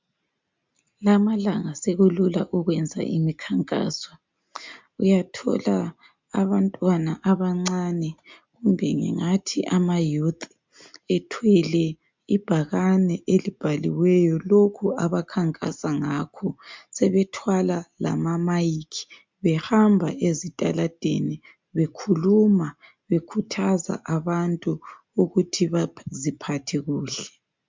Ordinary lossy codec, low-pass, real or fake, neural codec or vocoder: MP3, 64 kbps; 7.2 kHz; real; none